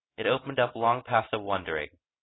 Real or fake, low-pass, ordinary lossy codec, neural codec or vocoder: real; 7.2 kHz; AAC, 16 kbps; none